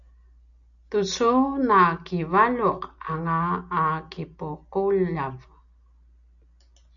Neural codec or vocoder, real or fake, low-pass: none; real; 7.2 kHz